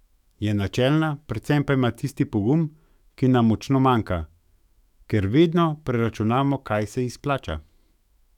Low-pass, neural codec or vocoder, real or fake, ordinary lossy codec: 19.8 kHz; autoencoder, 48 kHz, 128 numbers a frame, DAC-VAE, trained on Japanese speech; fake; none